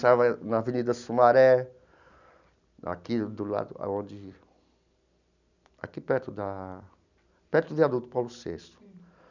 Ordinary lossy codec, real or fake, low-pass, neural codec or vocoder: none; real; 7.2 kHz; none